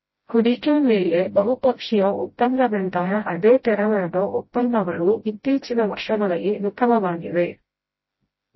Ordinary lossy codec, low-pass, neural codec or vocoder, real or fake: MP3, 24 kbps; 7.2 kHz; codec, 16 kHz, 0.5 kbps, FreqCodec, smaller model; fake